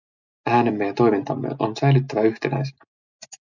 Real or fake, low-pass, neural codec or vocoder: real; 7.2 kHz; none